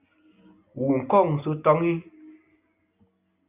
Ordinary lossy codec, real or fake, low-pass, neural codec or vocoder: Opus, 64 kbps; real; 3.6 kHz; none